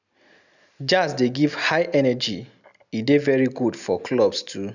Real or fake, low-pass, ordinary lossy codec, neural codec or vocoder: real; 7.2 kHz; none; none